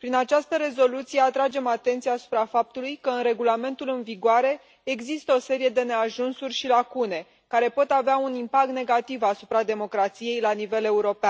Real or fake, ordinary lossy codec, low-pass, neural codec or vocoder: real; none; none; none